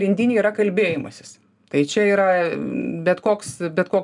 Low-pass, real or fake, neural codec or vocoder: 10.8 kHz; real; none